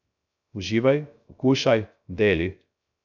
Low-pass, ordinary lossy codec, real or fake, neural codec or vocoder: 7.2 kHz; none; fake; codec, 16 kHz, 0.3 kbps, FocalCodec